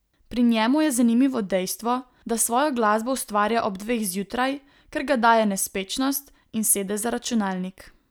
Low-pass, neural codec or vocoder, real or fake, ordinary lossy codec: none; none; real; none